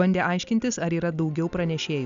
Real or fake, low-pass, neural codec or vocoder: real; 7.2 kHz; none